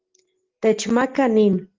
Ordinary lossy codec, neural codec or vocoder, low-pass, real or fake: Opus, 16 kbps; none; 7.2 kHz; real